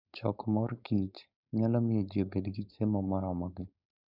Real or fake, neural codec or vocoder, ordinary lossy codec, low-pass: fake; codec, 16 kHz, 4.8 kbps, FACodec; none; 5.4 kHz